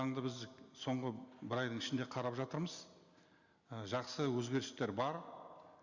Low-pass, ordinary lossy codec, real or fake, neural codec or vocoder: 7.2 kHz; Opus, 64 kbps; real; none